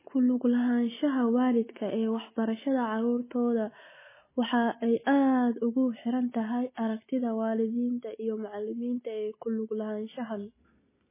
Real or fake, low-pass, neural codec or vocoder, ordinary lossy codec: real; 3.6 kHz; none; MP3, 16 kbps